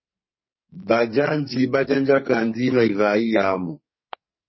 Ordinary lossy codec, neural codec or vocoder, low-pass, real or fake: MP3, 24 kbps; codec, 44.1 kHz, 2.6 kbps, SNAC; 7.2 kHz; fake